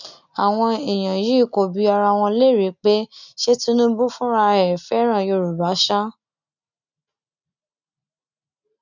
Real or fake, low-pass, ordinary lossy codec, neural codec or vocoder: real; 7.2 kHz; none; none